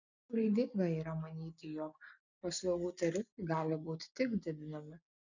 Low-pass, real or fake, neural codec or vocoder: 7.2 kHz; real; none